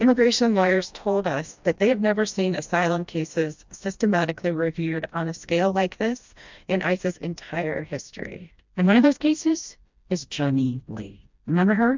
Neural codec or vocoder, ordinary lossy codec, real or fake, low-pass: codec, 16 kHz, 1 kbps, FreqCodec, smaller model; MP3, 64 kbps; fake; 7.2 kHz